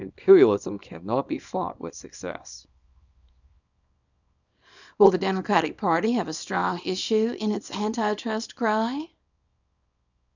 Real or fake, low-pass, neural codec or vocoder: fake; 7.2 kHz; codec, 24 kHz, 0.9 kbps, WavTokenizer, small release